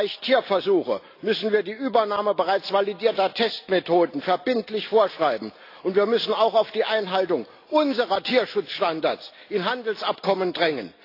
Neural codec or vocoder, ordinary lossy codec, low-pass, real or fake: none; AAC, 32 kbps; 5.4 kHz; real